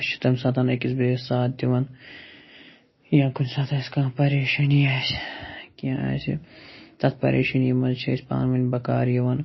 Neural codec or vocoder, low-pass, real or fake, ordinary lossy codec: none; 7.2 kHz; real; MP3, 24 kbps